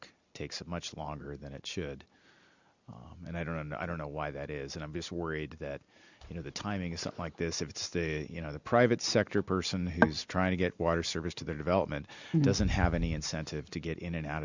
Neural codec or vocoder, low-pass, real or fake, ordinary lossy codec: none; 7.2 kHz; real; Opus, 64 kbps